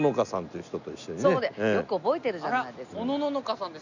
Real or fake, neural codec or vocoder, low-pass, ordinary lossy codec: real; none; 7.2 kHz; none